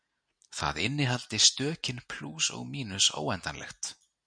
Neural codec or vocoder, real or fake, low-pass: none; real; 9.9 kHz